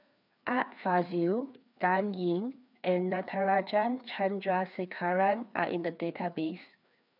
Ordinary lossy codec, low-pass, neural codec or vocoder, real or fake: none; 5.4 kHz; codec, 16 kHz, 2 kbps, FreqCodec, larger model; fake